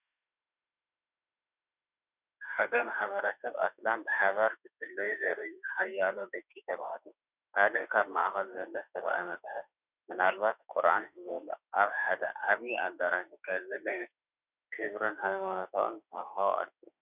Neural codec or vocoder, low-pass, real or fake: autoencoder, 48 kHz, 32 numbers a frame, DAC-VAE, trained on Japanese speech; 3.6 kHz; fake